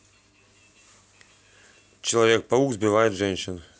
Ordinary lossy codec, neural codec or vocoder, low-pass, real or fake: none; none; none; real